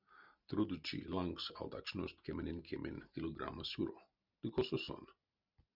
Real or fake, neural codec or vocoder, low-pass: real; none; 5.4 kHz